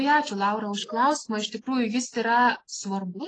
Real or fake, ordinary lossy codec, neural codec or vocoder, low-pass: real; AAC, 32 kbps; none; 9.9 kHz